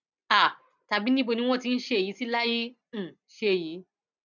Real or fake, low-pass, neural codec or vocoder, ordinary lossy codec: real; 7.2 kHz; none; none